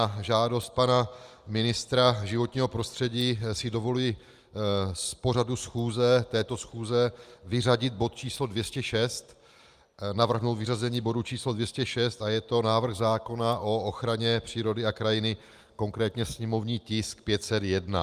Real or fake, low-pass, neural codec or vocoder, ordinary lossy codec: real; 14.4 kHz; none; Opus, 32 kbps